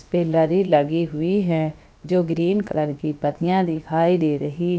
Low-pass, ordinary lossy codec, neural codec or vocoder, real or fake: none; none; codec, 16 kHz, about 1 kbps, DyCAST, with the encoder's durations; fake